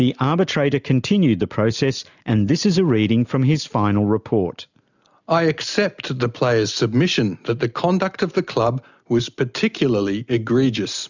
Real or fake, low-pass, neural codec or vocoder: real; 7.2 kHz; none